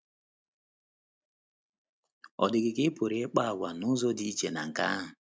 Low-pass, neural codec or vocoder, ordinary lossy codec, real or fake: none; none; none; real